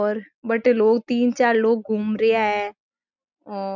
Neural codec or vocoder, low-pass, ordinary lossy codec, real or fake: none; 7.2 kHz; none; real